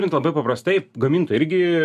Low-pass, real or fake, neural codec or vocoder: 14.4 kHz; real; none